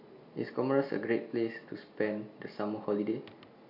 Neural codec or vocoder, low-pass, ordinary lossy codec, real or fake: none; 5.4 kHz; AAC, 48 kbps; real